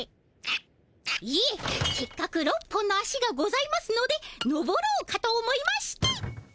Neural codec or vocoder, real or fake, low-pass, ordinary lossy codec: none; real; none; none